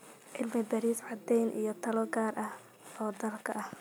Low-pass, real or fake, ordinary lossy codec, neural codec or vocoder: none; real; none; none